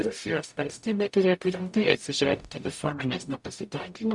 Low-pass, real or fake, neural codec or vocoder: 10.8 kHz; fake; codec, 44.1 kHz, 0.9 kbps, DAC